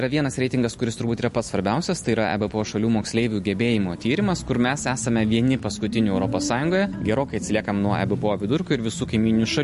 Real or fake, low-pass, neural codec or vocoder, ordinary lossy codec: real; 14.4 kHz; none; MP3, 48 kbps